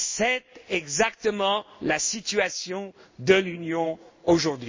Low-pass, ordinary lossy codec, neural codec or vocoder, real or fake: 7.2 kHz; MP3, 32 kbps; codec, 16 kHz in and 24 kHz out, 1 kbps, XY-Tokenizer; fake